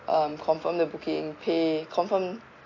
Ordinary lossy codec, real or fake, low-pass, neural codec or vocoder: AAC, 32 kbps; real; 7.2 kHz; none